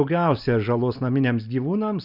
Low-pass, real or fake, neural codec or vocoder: 5.4 kHz; real; none